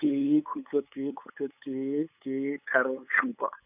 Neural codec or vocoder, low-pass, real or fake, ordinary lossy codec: codec, 16 kHz, 8 kbps, FunCodec, trained on LibriTTS, 25 frames a second; 3.6 kHz; fake; none